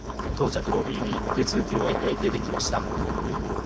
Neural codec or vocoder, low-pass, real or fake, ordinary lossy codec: codec, 16 kHz, 4.8 kbps, FACodec; none; fake; none